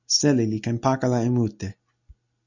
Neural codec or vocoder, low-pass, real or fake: none; 7.2 kHz; real